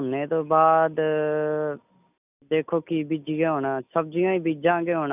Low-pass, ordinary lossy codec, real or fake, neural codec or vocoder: 3.6 kHz; none; real; none